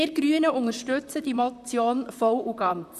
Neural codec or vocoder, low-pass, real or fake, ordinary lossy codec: vocoder, 44.1 kHz, 128 mel bands, Pupu-Vocoder; 14.4 kHz; fake; none